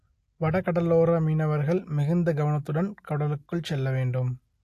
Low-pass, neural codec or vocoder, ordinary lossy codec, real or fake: 14.4 kHz; none; AAC, 64 kbps; real